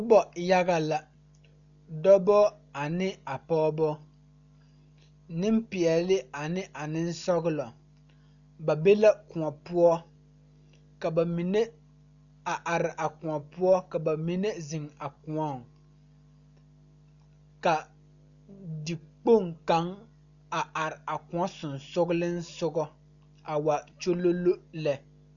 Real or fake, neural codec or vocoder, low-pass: real; none; 7.2 kHz